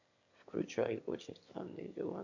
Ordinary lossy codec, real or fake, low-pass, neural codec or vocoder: AAC, 48 kbps; fake; 7.2 kHz; autoencoder, 22.05 kHz, a latent of 192 numbers a frame, VITS, trained on one speaker